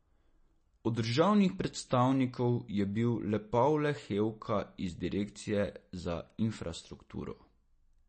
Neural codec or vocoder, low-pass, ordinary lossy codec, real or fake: none; 9.9 kHz; MP3, 32 kbps; real